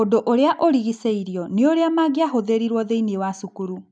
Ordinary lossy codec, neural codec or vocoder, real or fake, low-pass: none; none; real; 9.9 kHz